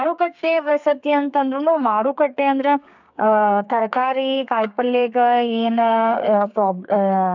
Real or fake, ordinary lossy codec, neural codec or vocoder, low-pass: fake; none; codec, 32 kHz, 1.9 kbps, SNAC; 7.2 kHz